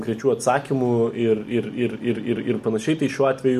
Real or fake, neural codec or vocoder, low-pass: real; none; 14.4 kHz